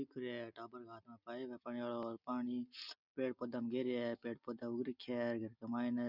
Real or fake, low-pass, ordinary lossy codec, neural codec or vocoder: real; 5.4 kHz; none; none